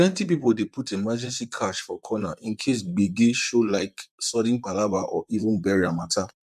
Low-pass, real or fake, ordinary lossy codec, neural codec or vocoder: 14.4 kHz; fake; MP3, 96 kbps; vocoder, 44.1 kHz, 128 mel bands, Pupu-Vocoder